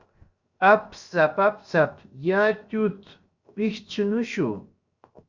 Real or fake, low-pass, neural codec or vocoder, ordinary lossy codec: fake; 7.2 kHz; codec, 16 kHz, 0.7 kbps, FocalCodec; Opus, 64 kbps